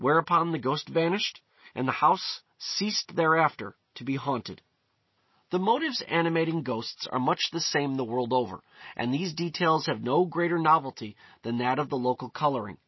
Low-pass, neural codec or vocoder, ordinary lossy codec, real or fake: 7.2 kHz; none; MP3, 24 kbps; real